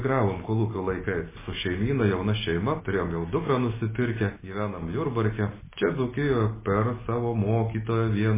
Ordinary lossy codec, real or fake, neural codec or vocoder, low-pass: MP3, 16 kbps; real; none; 3.6 kHz